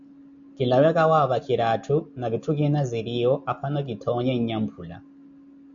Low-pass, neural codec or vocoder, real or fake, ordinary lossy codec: 7.2 kHz; none; real; AAC, 64 kbps